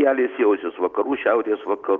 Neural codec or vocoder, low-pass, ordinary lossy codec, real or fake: none; 7.2 kHz; Opus, 24 kbps; real